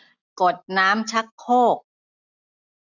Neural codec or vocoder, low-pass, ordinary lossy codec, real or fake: none; 7.2 kHz; none; real